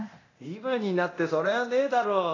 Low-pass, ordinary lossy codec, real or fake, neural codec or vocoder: 7.2 kHz; AAC, 32 kbps; fake; codec, 24 kHz, 0.9 kbps, DualCodec